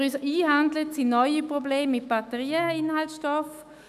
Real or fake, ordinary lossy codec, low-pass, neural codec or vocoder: fake; none; 14.4 kHz; autoencoder, 48 kHz, 128 numbers a frame, DAC-VAE, trained on Japanese speech